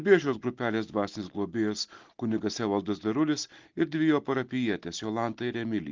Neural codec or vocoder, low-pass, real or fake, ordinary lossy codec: none; 7.2 kHz; real; Opus, 16 kbps